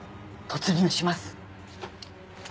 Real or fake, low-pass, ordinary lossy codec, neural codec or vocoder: real; none; none; none